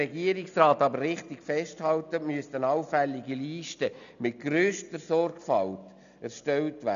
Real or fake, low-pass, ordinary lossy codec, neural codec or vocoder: real; 7.2 kHz; none; none